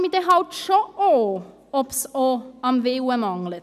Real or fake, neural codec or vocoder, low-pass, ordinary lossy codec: real; none; 14.4 kHz; none